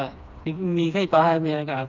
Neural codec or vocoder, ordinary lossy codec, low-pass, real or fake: codec, 16 kHz, 2 kbps, FreqCodec, smaller model; none; 7.2 kHz; fake